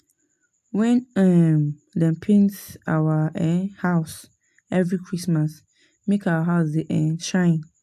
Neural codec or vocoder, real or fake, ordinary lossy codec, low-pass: none; real; AAC, 96 kbps; 14.4 kHz